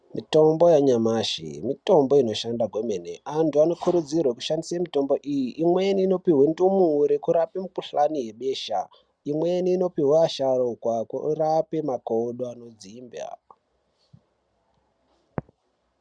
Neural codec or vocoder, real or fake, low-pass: none; real; 9.9 kHz